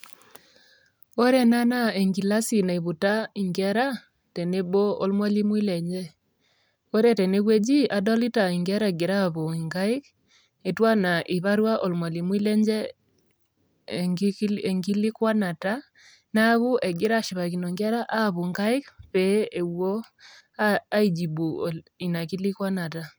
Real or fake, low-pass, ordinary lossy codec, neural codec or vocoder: real; none; none; none